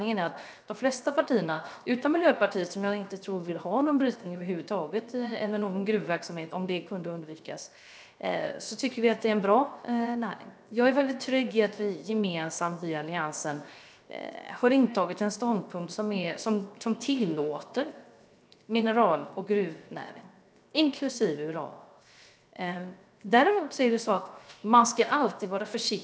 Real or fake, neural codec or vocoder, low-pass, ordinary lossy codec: fake; codec, 16 kHz, 0.7 kbps, FocalCodec; none; none